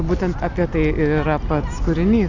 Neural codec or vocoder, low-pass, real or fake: none; 7.2 kHz; real